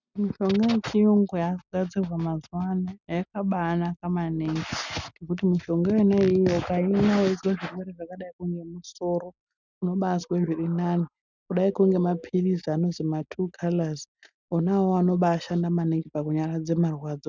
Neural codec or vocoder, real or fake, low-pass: none; real; 7.2 kHz